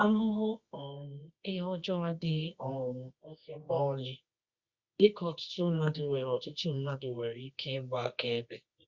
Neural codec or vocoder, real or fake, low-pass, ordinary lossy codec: codec, 24 kHz, 0.9 kbps, WavTokenizer, medium music audio release; fake; 7.2 kHz; Opus, 64 kbps